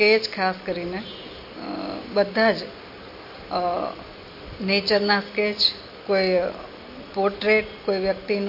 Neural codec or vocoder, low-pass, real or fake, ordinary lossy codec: none; 5.4 kHz; real; MP3, 32 kbps